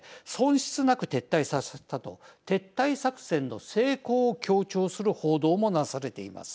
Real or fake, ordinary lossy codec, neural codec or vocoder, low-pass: real; none; none; none